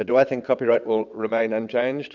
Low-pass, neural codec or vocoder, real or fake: 7.2 kHz; vocoder, 22.05 kHz, 80 mel bands, WaveNeXt; fake